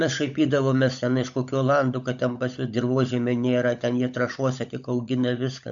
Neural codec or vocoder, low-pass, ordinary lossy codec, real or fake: codec, 16 kHz, 16 kbps, FunCodec, trained on LibriTTS, 50 frames a second; 7.2 kHz; MP3, 64 kbps; fake